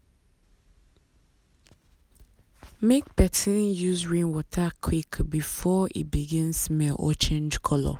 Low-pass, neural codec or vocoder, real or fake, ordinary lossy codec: 19.8 kHz; none; real; none